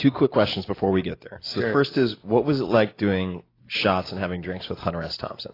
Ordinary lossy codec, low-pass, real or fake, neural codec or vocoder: AAC, 24 kbps; 5.4 kHz; real; none